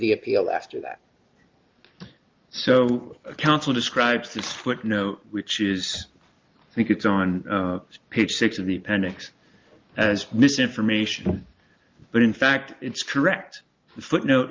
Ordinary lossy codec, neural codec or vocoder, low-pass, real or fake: Opus, 32 kbps; none; 7.2 kHz; real